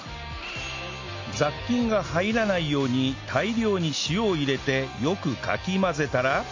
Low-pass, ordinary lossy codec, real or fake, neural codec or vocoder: 7.2 kHz; AAC, 48 kbps; real; none